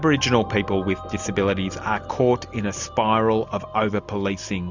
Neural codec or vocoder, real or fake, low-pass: none; real; 7.2 kHz